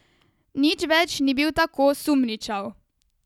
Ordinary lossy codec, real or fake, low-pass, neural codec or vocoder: none; real; 19.8 kHz; none